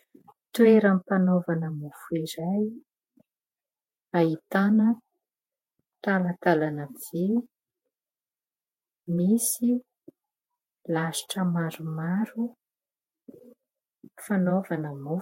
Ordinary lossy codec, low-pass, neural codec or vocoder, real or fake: MP3, 64 kbps; 19.8 kHz; vocoder, 48 kHz, 128 mel bands, Vocos; fake